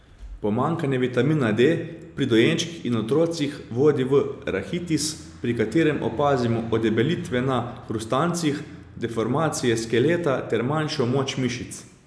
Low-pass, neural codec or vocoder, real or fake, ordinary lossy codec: none; none; real; none